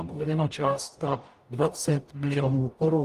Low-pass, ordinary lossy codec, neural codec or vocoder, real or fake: 14.4 kHz; Opus, 32 kbps; codec, 44.1 kHz, 0.9 kbps, DAC; fake